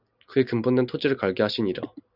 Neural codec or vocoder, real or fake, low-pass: none; real; 5.4 kHz